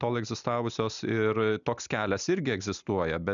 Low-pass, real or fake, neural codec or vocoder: 7.2 kHz; real; none